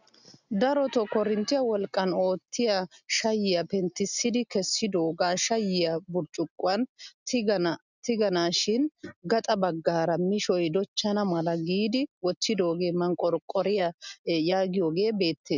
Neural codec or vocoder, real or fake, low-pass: none; real; 7.2 kHz